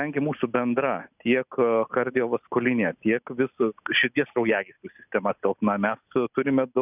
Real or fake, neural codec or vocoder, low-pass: real; none; 3.6 kHz